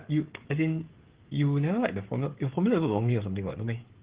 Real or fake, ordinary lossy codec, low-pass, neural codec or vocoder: fake; Opus, 16 kbps; 3.6 kHz; codec, 16 kHz, 16 kbps, FreqCodec, smaller model